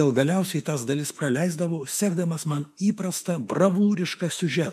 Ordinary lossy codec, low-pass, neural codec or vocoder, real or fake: MP3, 96 kbps; 14.4 kHz; autoencoder, 48 kHz, 32 numbers a frame, DAC-VAE, trained on Japanese speech; fake